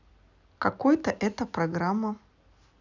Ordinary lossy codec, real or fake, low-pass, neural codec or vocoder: none; real; 7.2 kHz; none